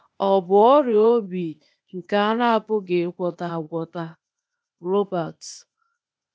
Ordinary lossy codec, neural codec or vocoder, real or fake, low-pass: none; codec, 16 kHz, 0.8 kbps, ZipCodec; fake; none